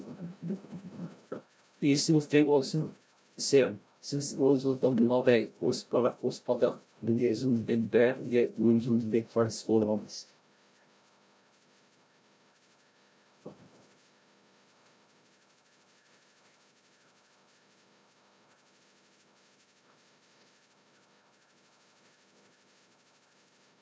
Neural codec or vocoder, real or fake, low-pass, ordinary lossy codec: codec, 16 kHz, 0.5 kbps, FreqCodec, larger model; fake; none; none